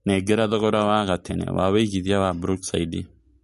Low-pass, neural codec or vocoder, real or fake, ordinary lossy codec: 14.4 kHz; none; real; MP3, 48 kbps